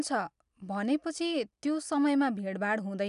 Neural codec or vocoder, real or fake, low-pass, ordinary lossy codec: none; real; 10.8 kHz; none